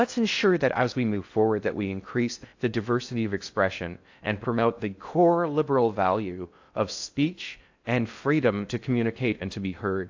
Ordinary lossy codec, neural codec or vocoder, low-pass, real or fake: AAC, 48 kbps; codec, 16 kHz in and 24 kHz out, 0.6 kbps, FocalCodec, streaming, 4096 codes; 7.2 kHz; fake